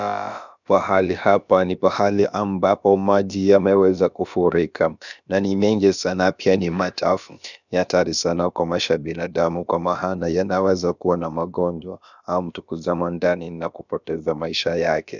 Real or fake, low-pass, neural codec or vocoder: fake; 7.2 kHz; codec, 16 kHz, about 1 kbps, DyCAST, with the encoder's durations